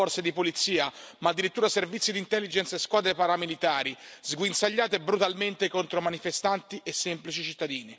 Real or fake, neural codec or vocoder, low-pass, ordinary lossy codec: real; none; none; none